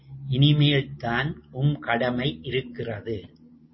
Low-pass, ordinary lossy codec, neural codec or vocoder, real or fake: 7.2 kHz; MP3, 24 kbps; none; real